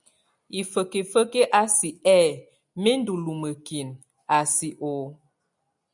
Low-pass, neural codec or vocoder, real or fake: 10.8 kHz; none; real